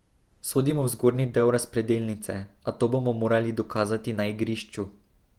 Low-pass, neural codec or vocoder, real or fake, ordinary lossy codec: 19.8 kHz; vocoder, 48 kHz, 128 mel bands, Vocos; fake; Opus, 24 kbps